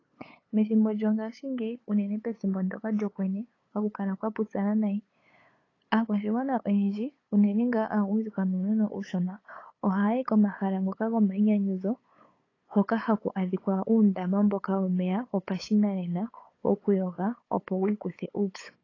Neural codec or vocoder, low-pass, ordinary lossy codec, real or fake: codec, 16 kHz, 8 kbps, FunCodec, trained on LibriTTS, 25 frames a second; 7.2 kHz; AAC, 32 kbps; fake